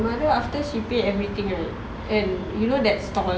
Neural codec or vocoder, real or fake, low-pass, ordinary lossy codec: none; real; none; none